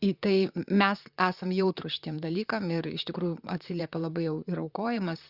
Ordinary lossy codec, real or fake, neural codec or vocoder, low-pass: Opus, 64 kbps; fake; vocoder, 44.1 kHz, 128 mel bands, Pupu-Vocoder; 5.4 kHz